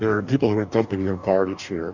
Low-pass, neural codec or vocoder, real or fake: 7.2 kHz; codec, 44.1 kHz, 2.6 kbps, DAC; fake